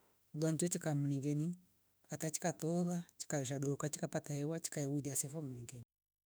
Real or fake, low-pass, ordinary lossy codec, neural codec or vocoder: fake; none; none; autoencoder, 48 kHz, 32 numbers a frame, DAC-VAE, trained on Japanese speech